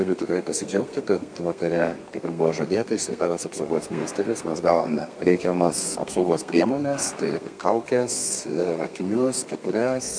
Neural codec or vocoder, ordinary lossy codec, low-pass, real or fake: codec, 32 kHz, 1.9 kbps, SNAC; MP3, 64 kbps; 9.9 kHz; fake